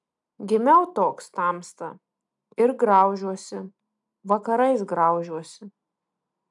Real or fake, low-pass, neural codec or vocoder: real; 10.8 kHz; none